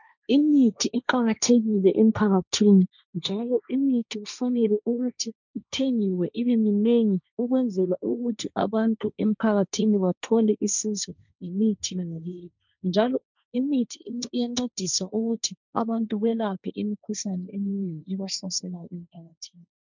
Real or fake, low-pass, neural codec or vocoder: fake; 7.2 kHz; codec, 16 kHz, 1.1 kbps, Voila-Tokenizer